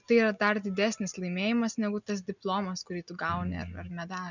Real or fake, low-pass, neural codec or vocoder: real; 7.2 kHz; none